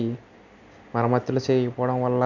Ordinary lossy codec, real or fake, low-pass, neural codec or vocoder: none; real; 7.2 kHz; none